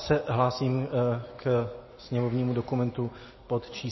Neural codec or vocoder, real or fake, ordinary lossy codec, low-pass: none; real; MP3, 24 kbps; 7.2 kHz